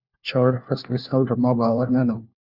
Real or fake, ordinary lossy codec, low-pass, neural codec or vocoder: fake; Opus, 64 kbps; 5.4 kHz; codec, 16 kHz, 1 kbps, FunCodec, trained on LibriTTS, 50 frames a second